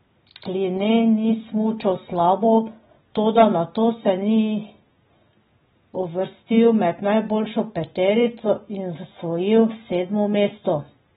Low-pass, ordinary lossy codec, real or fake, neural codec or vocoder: 7.2 kHz; AAC, 16 kbps; real; none